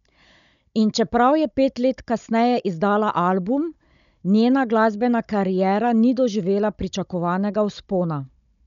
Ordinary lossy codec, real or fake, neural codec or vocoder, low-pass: none; fake; codec, 16 kHz, 16 kbps, FunCodec, trained on Chinese and English, 50 frames a second; 7.2 kHz